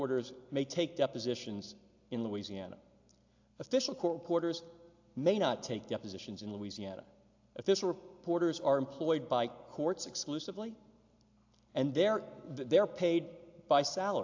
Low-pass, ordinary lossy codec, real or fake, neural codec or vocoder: 7.2 kHz; AAC, 48 kbps; real; none